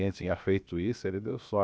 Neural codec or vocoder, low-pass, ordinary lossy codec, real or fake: codec, 16 kHz, 0.7 kbps, FocalCodec; none; none; fake